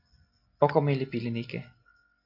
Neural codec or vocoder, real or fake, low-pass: none; real; 5.4 kHz